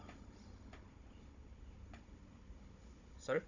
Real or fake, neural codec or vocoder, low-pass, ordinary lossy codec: fake; codec, 16 kHz, 16 kbps, FunCodec, trained on Chinese and English, 50 frames a second; 7.2 kHz; none